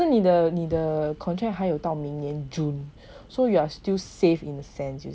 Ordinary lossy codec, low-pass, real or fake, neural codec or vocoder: none; none; real; none